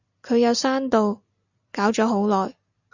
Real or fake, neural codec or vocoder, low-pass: real; none; 7.2 kHz